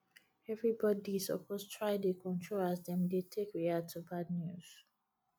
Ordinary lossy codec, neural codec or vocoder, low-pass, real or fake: none; none; none; real